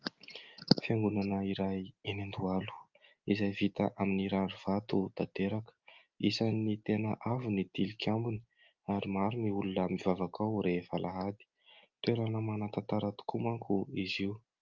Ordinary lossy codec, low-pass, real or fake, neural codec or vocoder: Opus, 24 kbps; 7.2 kHz; real; none